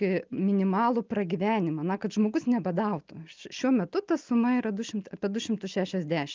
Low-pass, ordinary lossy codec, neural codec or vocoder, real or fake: 7.2 kHz; Opus, 32 kbps; none; real